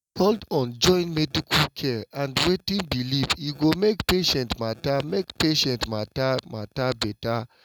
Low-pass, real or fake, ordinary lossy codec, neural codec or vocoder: 19.8 kHz; real; none; none